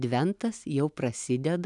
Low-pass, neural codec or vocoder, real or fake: 10.8 kHz; none; real